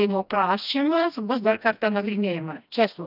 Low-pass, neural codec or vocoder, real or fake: 5.4 kHz; codec, 16 kHz, 1 kbps, FreqCodec, smaller model; fake